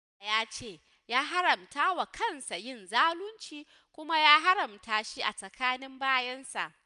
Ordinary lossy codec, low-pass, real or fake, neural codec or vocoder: none; 10.8 kHz; real; none